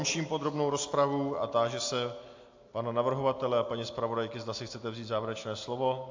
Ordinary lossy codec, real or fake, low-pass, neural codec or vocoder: AAC, 48 kbps; real; 7.2 kHz; none